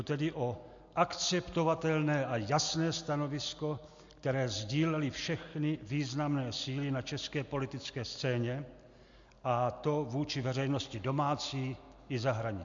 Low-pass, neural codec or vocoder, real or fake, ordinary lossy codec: 7.2 kHz; none; real; MP3, 64 kbps